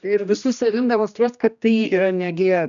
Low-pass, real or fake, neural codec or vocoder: 7.2 kHz; fake; codec, 16 kHz, 1 kbps, X-Codec, HuBERT features, trained on general audio